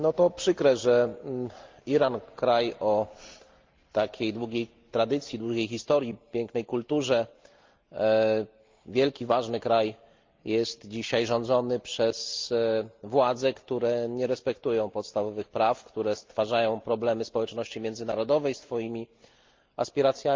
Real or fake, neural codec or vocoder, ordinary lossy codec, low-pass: real; none; Opus, 32 kbps; 7.2 kHz